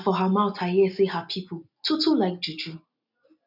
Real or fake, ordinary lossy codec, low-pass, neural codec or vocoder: real; none; 5.4 kHz; none